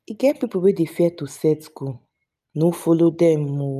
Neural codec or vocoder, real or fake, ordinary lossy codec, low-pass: none; real; none; 14.4 kHz